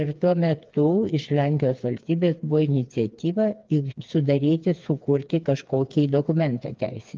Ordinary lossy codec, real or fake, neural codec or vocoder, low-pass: Opus, 24 kbps; fake; codec, 16 kHz, 4 kbps, FreqCodec, smaller model; 7.2 kHz